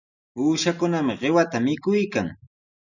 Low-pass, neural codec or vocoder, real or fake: 7.2 kHz; none; real